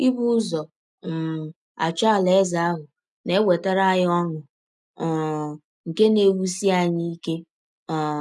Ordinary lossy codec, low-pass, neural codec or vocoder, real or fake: none; none; none; real